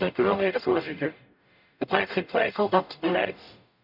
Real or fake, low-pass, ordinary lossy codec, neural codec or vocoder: fake; 5.4 kHz; none; codec, 44.1 kHz, 0.9 kbps, DAC